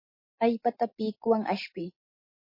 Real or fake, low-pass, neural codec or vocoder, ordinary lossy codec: real; 5.4 kHz; none; MP3, 24 kbps